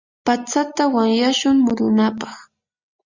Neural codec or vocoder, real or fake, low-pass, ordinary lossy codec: none; real; 7.2 kHz; Opus, 64 kbps